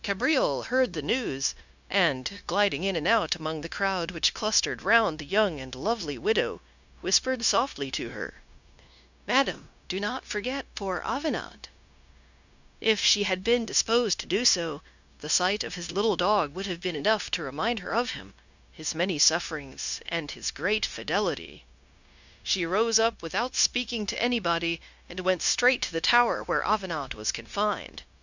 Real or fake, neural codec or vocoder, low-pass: fake; codec, 16 kHz, 0.9 kbps, LongCat-Audio-Codec; 7.2 kHz